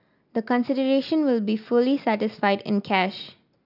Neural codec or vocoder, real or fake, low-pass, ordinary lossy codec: none; real; 5.4 kHz; none